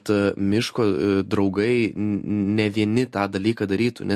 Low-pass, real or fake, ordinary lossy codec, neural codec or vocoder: 14.4 kHz; real; MP3, 64 kbps; none